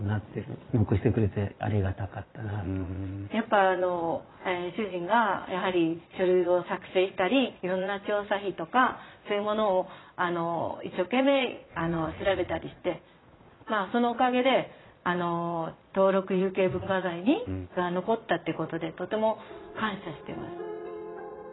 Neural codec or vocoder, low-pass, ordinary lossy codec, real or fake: vocoder, 22.05 kHz, 80 mel bands, Vocos; 7.2 kHz; AAC, 16 kbps; fake